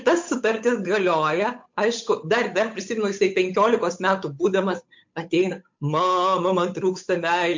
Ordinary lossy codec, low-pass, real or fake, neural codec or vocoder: MP3, 48 kbps; 7.2 kHz; fake; codec, 16 kHz, 8 kbps, FunCodec, trained on Chinese and English, 25 frames a second